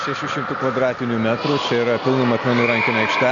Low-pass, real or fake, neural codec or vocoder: 7.2 kHz; real; none